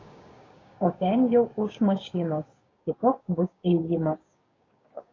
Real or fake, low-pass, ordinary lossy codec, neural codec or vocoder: fake; 7.2 kHz; AAC, 32 kbps; codec, 16 kHz, 6 kbps, DAC